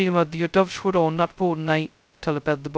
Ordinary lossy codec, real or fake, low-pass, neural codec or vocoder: none; fake; none; codec, 16 kHz, 0.2 kbps, FocalCodec